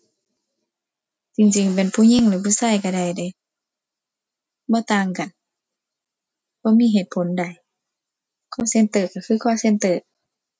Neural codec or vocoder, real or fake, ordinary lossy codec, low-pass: none; real; none; none